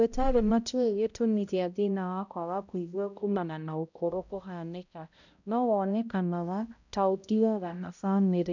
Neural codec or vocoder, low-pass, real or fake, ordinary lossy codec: codec, 16 kHz, 0.5 kbps, X-Codec, HuBERT features, trained on balanced general audio; 7.2 kHz; fake; none